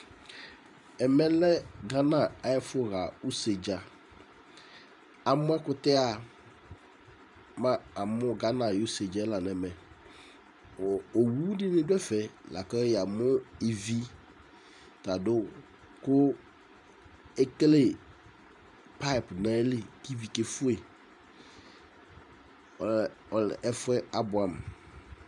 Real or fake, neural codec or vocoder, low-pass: fake; vocoder, 44.1 kHz, 128 mel bands every 512 samples, BigVGAN v2; 10.8 kHz